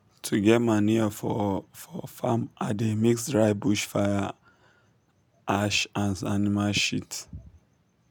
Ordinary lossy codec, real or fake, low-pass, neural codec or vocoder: none; real; none; none